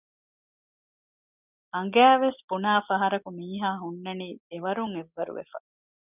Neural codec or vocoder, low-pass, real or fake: none; 3.6 kHz; real